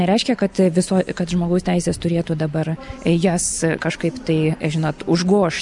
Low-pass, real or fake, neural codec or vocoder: 10.8 kHz; real; none